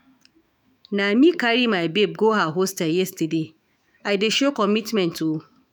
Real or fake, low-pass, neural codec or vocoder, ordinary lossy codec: fake; none; autoencoder, 48 kHz, 128 numbers a frame, DAC-VAE, trained on Japanese speech; none